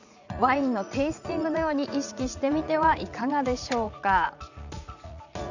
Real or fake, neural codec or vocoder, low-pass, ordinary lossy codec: fake; vocoder, 44.1 kHz, 80 mel bands, Vocos; 7.2 kHz; none